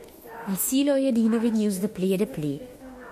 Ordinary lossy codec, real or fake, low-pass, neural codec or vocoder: MP3, 64 kbps; fake; 14.4 kHz; autoencoder, 48 kHz, 32 numbers a frame, DAC-VAE, trained on Japanese speech